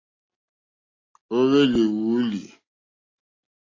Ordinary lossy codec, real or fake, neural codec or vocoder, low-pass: AAC, 32 kbps; real; none; 7.2 kHz